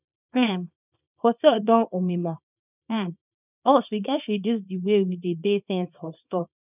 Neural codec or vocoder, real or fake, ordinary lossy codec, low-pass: codec, 24 kHz, 0.9 kbps, WavTokenizer, small release; fake; none; 3.6 kHz